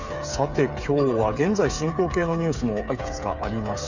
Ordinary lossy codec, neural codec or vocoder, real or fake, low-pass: none; codec, 16 kHz, 8 kbps, FreqCodec, smaller model; fake; 7.2 kHz